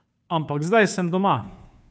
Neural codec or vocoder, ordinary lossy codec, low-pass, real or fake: codec, 16 kHz, 2 kbps, FunCodec, trained on Chinese and English, 25 frames a second; none; none; fake